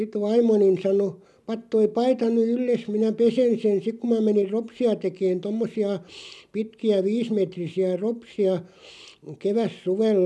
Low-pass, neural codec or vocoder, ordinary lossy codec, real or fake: none; none; none; real